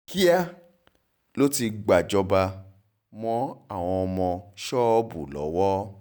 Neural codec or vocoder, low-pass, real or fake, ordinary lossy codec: none; none; real; none